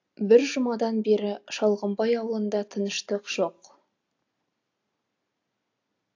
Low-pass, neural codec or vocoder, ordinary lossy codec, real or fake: 7.2 kHz; none; AAC, 48 kbps; real